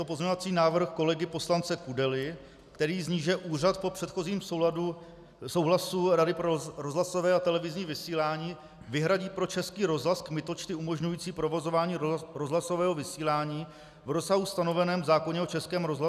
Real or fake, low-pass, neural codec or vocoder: real; 14.4 kHz; none